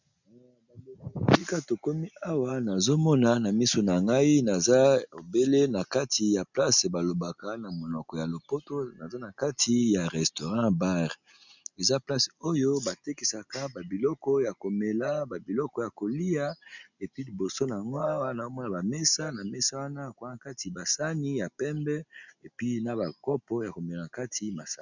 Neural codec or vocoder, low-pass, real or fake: none; 7.2 kHz; real